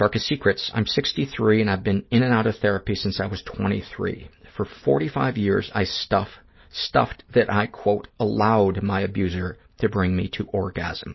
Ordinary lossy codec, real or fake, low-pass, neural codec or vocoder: MP3, 24 kbps; real; 7.2 kHz; none